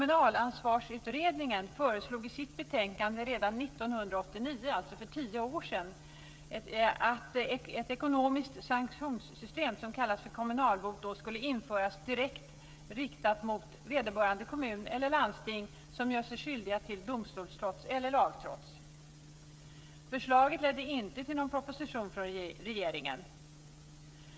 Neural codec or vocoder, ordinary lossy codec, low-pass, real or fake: codec, 16 kHz, 16 kbps, FreqCodec, smaller model; none; none; fake